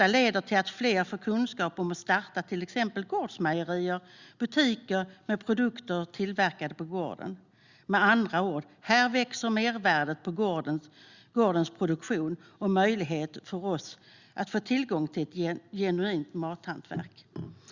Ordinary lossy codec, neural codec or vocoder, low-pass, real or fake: Opus, 64 kbps; none; 7.2 kHz; real